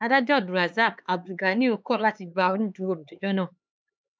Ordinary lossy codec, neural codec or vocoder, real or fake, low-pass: none; codec, 16 kHz, 4 kbps, X-Codec, HuBERT features, trained on LibriSpeech; fake; none